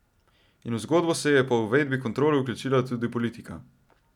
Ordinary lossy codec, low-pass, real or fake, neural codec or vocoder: none; 19.8 kHz; real; none